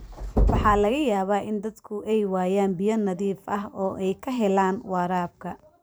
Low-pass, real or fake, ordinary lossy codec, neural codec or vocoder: none; fake; none; vocoder, 44.1 kHz, 128 mel bands every 512 samples, BigVGAN v2